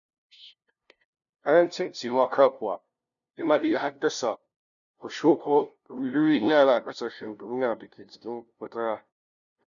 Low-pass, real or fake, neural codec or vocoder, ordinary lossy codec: 7.2 kHz; fake; codec, 16 kHz, 0.5 kbps, FunCodec, trained on LibriTTS, 25 frames a second; none